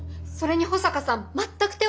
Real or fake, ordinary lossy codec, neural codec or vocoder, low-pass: real; none; none; none